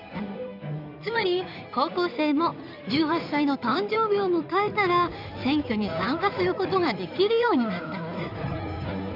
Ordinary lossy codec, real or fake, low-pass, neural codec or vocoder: none; fake; 5.4 kHz; codec, 16 kHz in and 24 kHz out, 2.2 kbps, FireRedTTS-2 codec